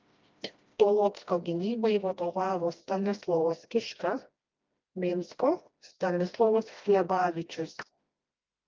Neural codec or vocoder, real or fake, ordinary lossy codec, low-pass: codec, 16 kHz, 1 kbps, FreqCodec, smaller model; fake; Opus, 24 kbps; 7.2 kHz